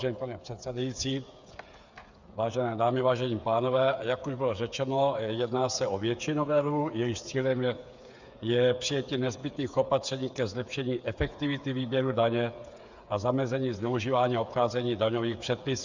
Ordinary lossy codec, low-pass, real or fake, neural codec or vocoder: Opus, 64 kbps; 7.2 kHz; fake; codec, 16 kHz, 8 kbps, FreqCodec, smaller model